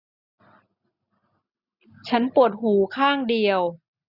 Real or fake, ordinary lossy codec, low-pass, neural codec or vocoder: real; none; 5.4 kHz; none